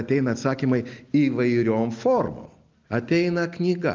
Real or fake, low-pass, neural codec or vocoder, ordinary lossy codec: real; 7.2 kHz; none; Opus, 24 kbps